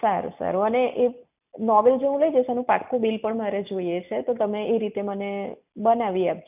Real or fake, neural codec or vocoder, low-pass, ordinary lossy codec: real; none; 3.6 kHz; none